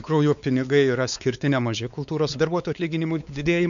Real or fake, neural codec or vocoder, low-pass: fake; codec, 16 kHz, 4 kbps, X-Codec, WavLM features, trained on Multilingual LibriSpeech; 7.2 kHz